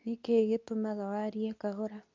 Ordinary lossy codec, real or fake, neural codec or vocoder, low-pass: MP3, 64 kbps; fake; codec, 24 kHz, 0.9 kbps, WavTokenizer, medium speech release version 2; 7.2 kHz